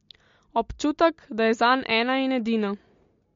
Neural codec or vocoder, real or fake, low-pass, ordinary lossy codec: none; real; 7.2 kHz; MP3, 48 kbps